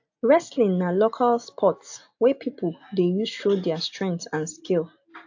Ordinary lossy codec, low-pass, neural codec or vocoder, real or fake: AAC, 48 kbps; 7.2 kHz; none; real